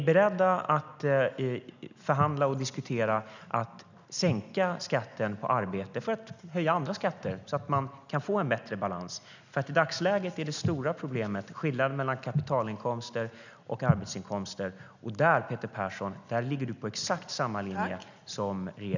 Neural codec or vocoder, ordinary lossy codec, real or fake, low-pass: none; none; real; 7.2 kHz